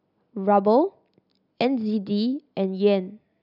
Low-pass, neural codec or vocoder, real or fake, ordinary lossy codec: 5.4 kHz; none; real; none